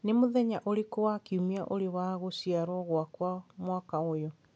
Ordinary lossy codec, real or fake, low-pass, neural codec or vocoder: none; real; none; none